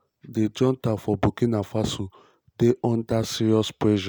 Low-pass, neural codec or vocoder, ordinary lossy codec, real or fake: none; none; none; real